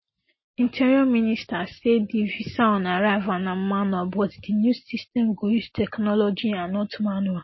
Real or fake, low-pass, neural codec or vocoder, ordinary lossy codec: real; 7.2 kHz; none; MP3, 24 kbps